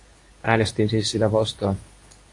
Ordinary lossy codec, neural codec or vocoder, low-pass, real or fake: AAC, 48 kbps; codec, 24 kHz, 0.9 kbps, WavTokenizer, medium speech release version 2; 10.8 kHz; fake